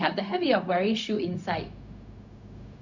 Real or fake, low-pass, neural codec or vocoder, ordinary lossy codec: fake; 7.2 kHz; codec, 16 kHz, 0.4 kbps, LongCat-Audio-Codec; Opus, 64 kbps